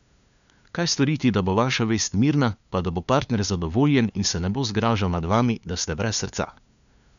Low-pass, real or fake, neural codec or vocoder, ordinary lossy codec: 7.2 kHz; fake; codec, 16 kHz, 2 kbps, FunCodec, trained on LibriTTS, 25 frames a second; none